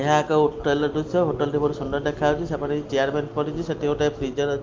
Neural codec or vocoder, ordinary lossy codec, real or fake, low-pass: none; Opus, 32 kbps; real; 7.2 kHz